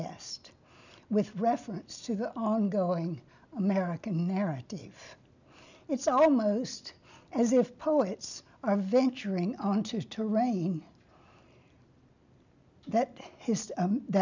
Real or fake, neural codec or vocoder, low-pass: real; none; 7.2 kHz